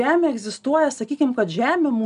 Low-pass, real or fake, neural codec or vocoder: 10.8 kHz; real; none